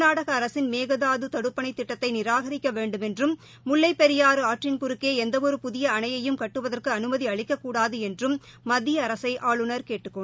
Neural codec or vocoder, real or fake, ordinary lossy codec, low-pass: none; real; none; none